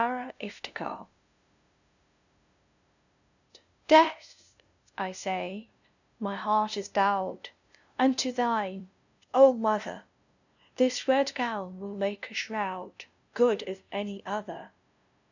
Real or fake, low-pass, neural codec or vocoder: fake; 7.2 kHz; codec, 16 kHz, 0.5 kbps, FunCodec, trained on LibriTTS, 25 frames a second